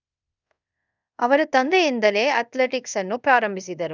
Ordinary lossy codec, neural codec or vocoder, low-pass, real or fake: none; codec, 24 kHz, 0.5 kbps, DualCodec; 7.2 kHz; fake